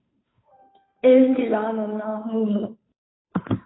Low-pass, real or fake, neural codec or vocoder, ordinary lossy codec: 7.2 kHz; fake; codec, 16 kHz, 2 kbps, FunCodec, trained on Chinese and English, 25 frames a second; AAC, 16 kbps